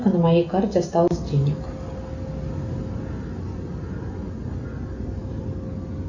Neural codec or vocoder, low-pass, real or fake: none; 7.2 kHz; real